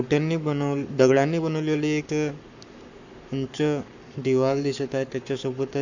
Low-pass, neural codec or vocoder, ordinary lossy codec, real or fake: 7.2 kHz; codec, 44.1 kHz, 7.8 kbps, Pupu-Codec; none; fake